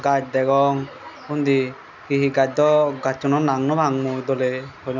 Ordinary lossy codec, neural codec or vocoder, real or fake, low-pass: none; none; real; 7.2 kHz